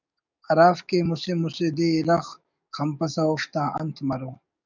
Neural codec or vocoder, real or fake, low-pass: codec, 44.1 kHz, 7.8 kbps, DAC; fake; 7.2 kHz